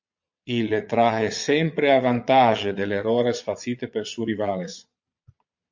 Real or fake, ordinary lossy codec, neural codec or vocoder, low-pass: fake; MP3, 48 kbps; vocoder, 22.05 kHz, 80 mel bands, Vocos; 7.2 kHz